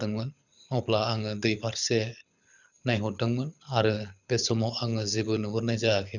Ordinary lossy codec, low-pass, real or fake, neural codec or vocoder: none; 7.2 kHz; fake; codec, 24 kHz, 6 kbps, HILCodec